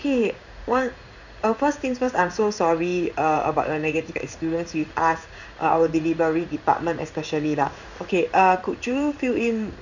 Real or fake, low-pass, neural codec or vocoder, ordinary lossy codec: fake; 7.2 kHz; codec, 16 kHz in and 24 kHz out, 1 kbps, XY-Tokenizer; none